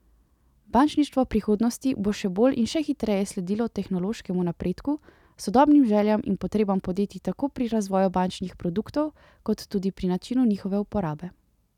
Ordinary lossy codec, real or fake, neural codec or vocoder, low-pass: none; real; none; 19.8 kHz